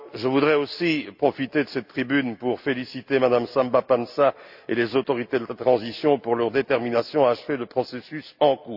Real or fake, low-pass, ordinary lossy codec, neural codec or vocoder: real; 5.4 kHz; AAC, 48 kbps; none